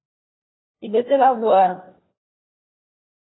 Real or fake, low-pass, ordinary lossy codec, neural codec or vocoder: fake; 7.2 kHz; AAC, 16 kbps; codec, 16 kHz, 1 kbps, FunCodec, trained on LibriTTS, 50 frames a second